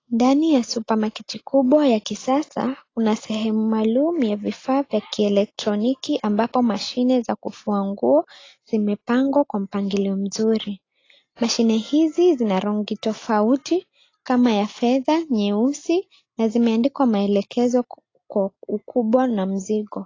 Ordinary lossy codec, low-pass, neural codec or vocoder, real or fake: AAC, 32 kbps; 7.2 kHz; none; real